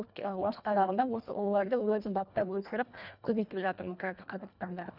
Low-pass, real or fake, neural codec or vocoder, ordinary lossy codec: 5.4 kHz; fake; codec, 24 kHz, 1.5 kbps, HILCodec; none